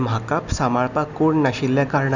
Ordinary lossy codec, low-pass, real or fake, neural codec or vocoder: none; 7.2 kHz; real; none